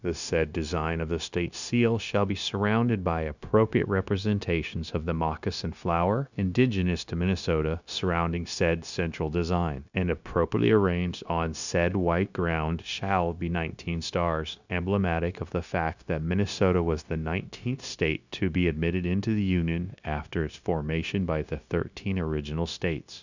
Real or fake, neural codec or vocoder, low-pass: fake; codec, 16 kHz, 0.9 kbps, LongCat-Audio-Codec; 7.2 kHz